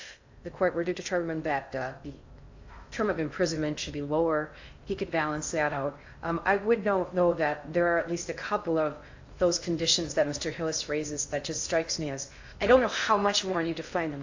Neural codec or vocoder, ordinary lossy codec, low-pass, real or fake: codec, 16 kHz in and 24 kHz out, 0.6 kbps, FocalCodec, streaming, 2048 codes; AAC, 48 kbps; 7.2 kHz; fake